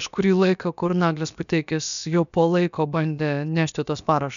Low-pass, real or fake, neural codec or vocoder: 7.2 kHz; fake; codec, 16 kHz, about 1 kbps, DyCAST, with the encoder's durations